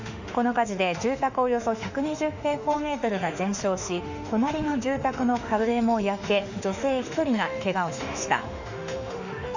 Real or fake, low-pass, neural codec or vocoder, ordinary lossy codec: fake; 7.2 kHz; autoencoder, 48 kHz, 32 numbers a frame, DAC-VAE, trained on Japanese speech; none